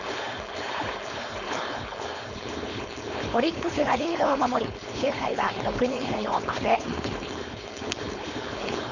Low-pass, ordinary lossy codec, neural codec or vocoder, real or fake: 7.2 kHz; none; codec, 16 kHz, 4.8 kbps, FACodec; fake